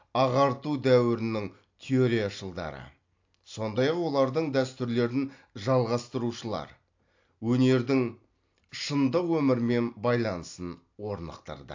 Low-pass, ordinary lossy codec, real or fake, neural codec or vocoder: 7.2 kHz; AAC, 48 kbps; real; none